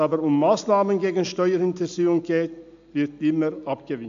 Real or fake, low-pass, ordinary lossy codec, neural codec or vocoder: real; 7.2 kHz; AAC, 64 kbps; none